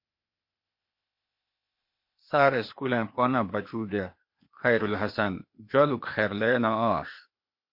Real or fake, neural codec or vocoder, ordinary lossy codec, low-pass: fake; codec, 16 kHz, 0.8 kbps, ZipCodec; MP3, 32 kbps; 5.4 kHz